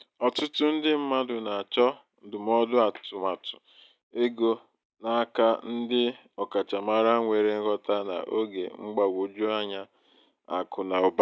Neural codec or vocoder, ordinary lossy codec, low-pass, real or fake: none; none; none; real